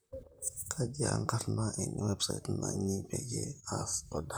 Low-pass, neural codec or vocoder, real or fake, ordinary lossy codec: none; vocoder, 44.1 kHz, 128 mel bands, Pupu-Vocoder; fake; none